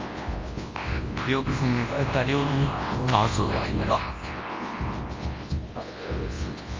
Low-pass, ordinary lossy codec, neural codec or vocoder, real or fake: 7.2 kHz; Opus, 32 kbps; codec, 24 kHz, 0.9 kbps, WavTokenizer, large speech release; fake